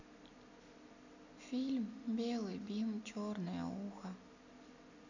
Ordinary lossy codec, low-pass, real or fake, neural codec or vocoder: none; 7.2 kHz; real; none